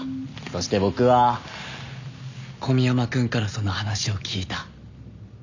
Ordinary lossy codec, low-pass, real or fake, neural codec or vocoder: none; 7.2 kHz; real; none